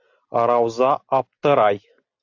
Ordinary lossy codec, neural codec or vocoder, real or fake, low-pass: AAC, 48 kbps; none; real; 7.2 kHz